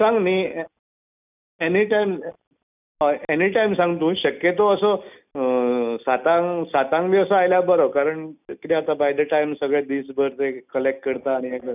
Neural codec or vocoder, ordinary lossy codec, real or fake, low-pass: none; none; real; 3.6 kHz